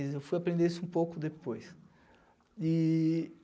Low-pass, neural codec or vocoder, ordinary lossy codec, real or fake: none; none; none; real